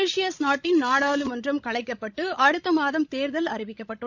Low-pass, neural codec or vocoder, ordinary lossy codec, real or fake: 7.2 kHz; codec, 16 kHz, 16 kbps, FreqCodec, larger model; none; fake